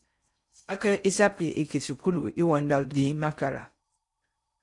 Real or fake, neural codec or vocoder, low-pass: fake; codec, 16 kHz in and 24 kHz out, 0.6 kbps, FocalCodec, streaming, 4096 codes; 10.8 kHz